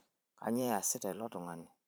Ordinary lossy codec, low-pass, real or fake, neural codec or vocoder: none; none; fake; vocoder, 44.1 kHz, 128 mel bands every 512 samples, BigVGAN v2